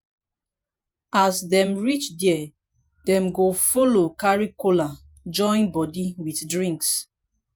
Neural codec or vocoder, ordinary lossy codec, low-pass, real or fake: vocoder, 48 kHz, 128 mel bands, Vocos; none; none; fake